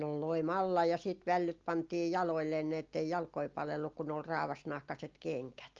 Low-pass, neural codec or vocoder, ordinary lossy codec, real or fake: 7.2 kHz; none; Opus, 24 kbps; real